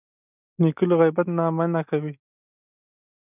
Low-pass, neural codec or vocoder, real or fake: 3.6 kHz; none; real